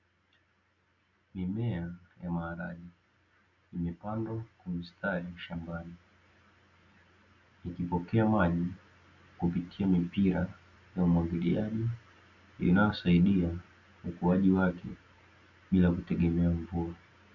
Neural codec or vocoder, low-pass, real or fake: none; 7.2 kHz; real